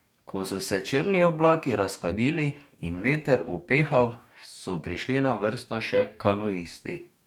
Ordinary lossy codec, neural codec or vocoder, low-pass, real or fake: none; codec, 44.1 kHz, 2.6 kbps, DAC; 19.8 kHz; fake